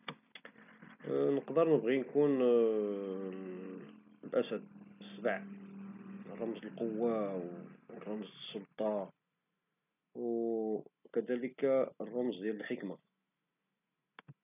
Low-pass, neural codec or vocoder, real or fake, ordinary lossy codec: 3.6 kHz; none; real; none